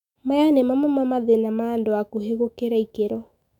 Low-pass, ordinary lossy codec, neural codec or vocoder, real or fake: 19.8 kHz; none; autoencoder, 48 kHz, 128 numbers a frame, DAC-VAE, trained on Japanese speech; fake